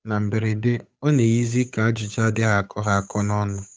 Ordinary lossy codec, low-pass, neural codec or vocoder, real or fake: none; none; codec, 16 kHz, 8 kbps, FunCodec, trained on Chinese and English, 25 frames a second; fake